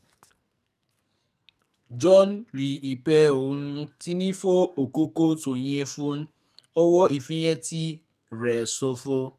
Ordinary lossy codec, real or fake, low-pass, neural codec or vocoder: none; fake; 14.4 kHz; codec, 44.1 kHz, 2.6 kbps, SNAC